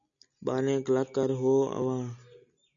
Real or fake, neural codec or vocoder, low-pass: real; none; 7.2 kHz